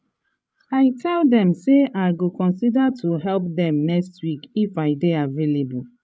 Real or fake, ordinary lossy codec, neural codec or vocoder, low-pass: fake; none; codec, 16 kHz, 16 kbps, FreqCodec, larger model; none